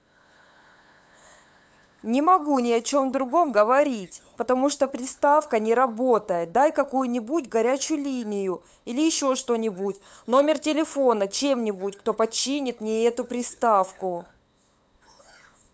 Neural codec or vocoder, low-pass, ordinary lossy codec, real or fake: codec, 16 kHz, 8 kbps, FunCodec, trained on LibriTTS, 25 frames a second; none; none; fake